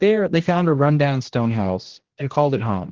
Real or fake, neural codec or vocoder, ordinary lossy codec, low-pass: fake; codec, 16 kHz, 1 kbps, X-Codec, HuBERT features, trained on general audio; Opus, 16 kbps; 7.2 kHz